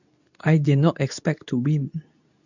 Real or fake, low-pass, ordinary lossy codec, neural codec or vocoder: fake; 7.2 kHz; none; codec, 24 kHz, 0.9 kbps, WavTokenizer, medium speech release version 2